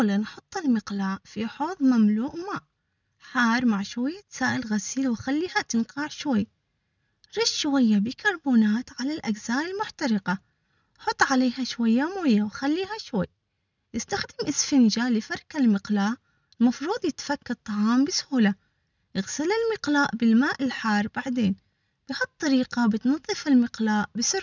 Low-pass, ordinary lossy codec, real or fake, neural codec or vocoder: 7.2 kHz; none; real; none